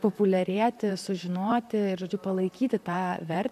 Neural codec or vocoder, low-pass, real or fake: vocoder, 44.1 kHz, 128 mel bands, Pupu-Vocoder; 14.4 kHz; fake